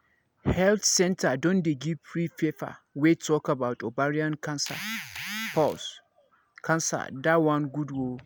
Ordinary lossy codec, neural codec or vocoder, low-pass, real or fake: none; none; none; real